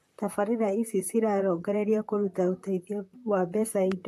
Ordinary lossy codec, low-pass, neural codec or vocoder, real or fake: AAC, 64 kbps; 14.4 kHz; vocoder, 44.1 kHz, 128 mel bands, Pupu-Vocoder; fake